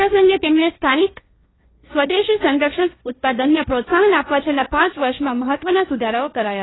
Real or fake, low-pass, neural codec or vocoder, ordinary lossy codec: fake; 7.2 kHz; codec, 16 kHz, 1.1 kbps, Voila-Tokenizer; AAC, 16 kbps